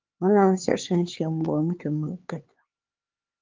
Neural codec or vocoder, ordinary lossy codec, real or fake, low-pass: codec, 16 kHz, 4 kbps, X-Codec, HuBERT features, trained on LibriSpeech; Opus, 32 kbps; fake; 7.2 kHz